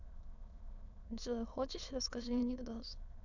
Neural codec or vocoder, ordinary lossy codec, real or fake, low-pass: autoencoder, 22.05 kHz, a latent of 192 numbers a frame, VITS, trained on many speakers; none; fake; 7.2 kHz